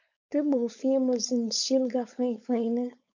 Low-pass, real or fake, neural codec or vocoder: 7.2 kHz; fake; codec, 16 kHz, 4.8 kbps, FACodec